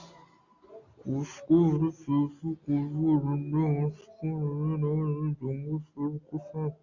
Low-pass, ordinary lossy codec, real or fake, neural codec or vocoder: 7.2 kHz; Opus, 64 kbps; real; none